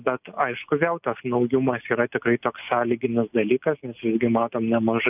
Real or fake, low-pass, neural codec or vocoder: real; 3.6 kHz; none